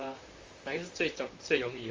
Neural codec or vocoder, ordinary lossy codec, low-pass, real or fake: vocoder, 44.1 kHz, 128 mel bands, Pupu-Vocoder; Opus, 32 kbps; 7.2 kHz; fake